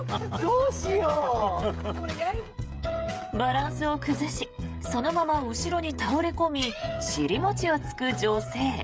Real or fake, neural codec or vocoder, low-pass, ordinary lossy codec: fake; codec, 16 kHz, 16 kbps, FreqCodec, smaller model; none; none